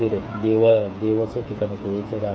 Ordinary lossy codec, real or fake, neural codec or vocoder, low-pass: none; fake; codec, 16 kHz, 8 kbps, FreqCodec, smaller model; none